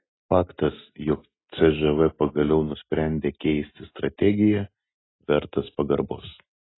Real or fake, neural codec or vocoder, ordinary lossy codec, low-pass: real; none; AAC, 16 kbps; 7.2 kHz